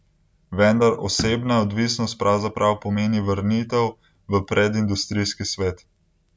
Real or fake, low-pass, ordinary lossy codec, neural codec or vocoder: real; none; none; none